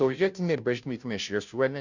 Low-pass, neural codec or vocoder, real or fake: 7.2 kHz; codec, 16 kHz, 0.5 kbps, FunCodec, trained on Chinese and English, 25 frames a second; fake